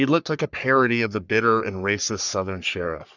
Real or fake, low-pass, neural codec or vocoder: fake; 7.2 kHz; codec, 44.1 kHz, 3.4 kbps, Pupu-Codec